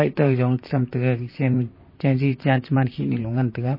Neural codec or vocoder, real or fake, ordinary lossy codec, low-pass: vocoder, 44.1 kHz, 128 mel bands, Pupu-Vocoder; fake; MP3, 24 kbps; 5.4 kHz